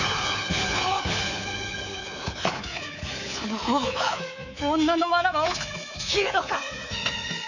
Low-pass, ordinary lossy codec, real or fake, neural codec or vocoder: 7.2 kHz; none; fake; codec, 24 kHz, 3.1 kbps, DualCodec